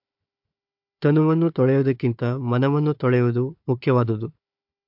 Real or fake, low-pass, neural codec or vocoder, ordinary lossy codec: fake; 5.4 kHz; codec, 16 kHz, 4 kbps, FunCodec, trained on Chinese and English, 50 frames a second; MP3, 48 kbps